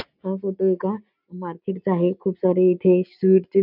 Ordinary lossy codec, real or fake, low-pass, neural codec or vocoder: none; real; 5.4 kHz; none